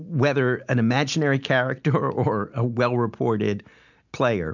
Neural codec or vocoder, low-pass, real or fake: none; 7.2 kHz; real